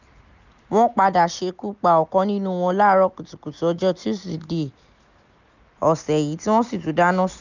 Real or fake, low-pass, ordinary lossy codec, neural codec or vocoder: real; 7.2 kHz; none; none